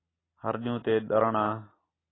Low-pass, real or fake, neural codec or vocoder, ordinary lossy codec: 7.2 kHz; real; none; AAC, 16 kbps